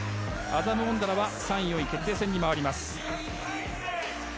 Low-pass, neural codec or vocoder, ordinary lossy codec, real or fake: none; none; none; real